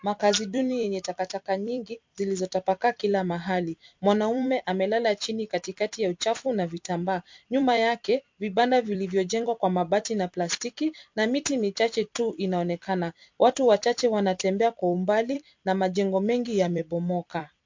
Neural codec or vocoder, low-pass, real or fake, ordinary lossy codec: vocoder, 44.1 kHz, 128 mel bands every 256 samples, BigVGAN v2; 7.2 kHz; fake; MP3, 48 kbps